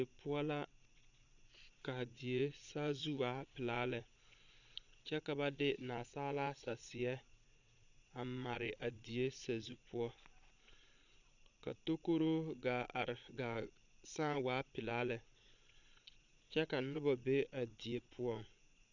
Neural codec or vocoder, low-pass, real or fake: vocoder, 22.05 kHz, 80 mel bands, Vocos; 7.2 kHz; fake